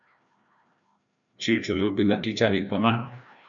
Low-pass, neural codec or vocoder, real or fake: 7.2 kHz; codec, 16 kHz, 1 kbps, FreqCodec, larger model; fake